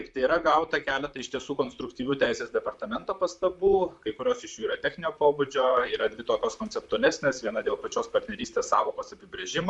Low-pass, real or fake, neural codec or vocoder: 10.8 kHz; fake; vocoder, 44.1 kHz, 128 mel bands, Pupu-Vocoder